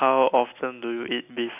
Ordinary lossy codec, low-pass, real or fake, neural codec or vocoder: none; 3.6 kHz; real; none